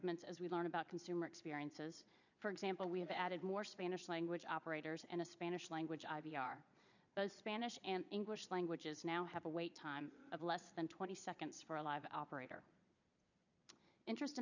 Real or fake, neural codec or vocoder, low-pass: fake; vocoder, 22.05 kHz, 80 mel bands, Vocos; 7.2 kHz